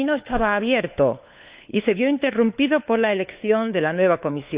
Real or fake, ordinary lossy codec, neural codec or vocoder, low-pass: fake; Opus, 64 kbps; codec, 16 kHz, 4 kbps, FunCodec, trained on LibriTTS, 50 frames a second; 3.6 kHz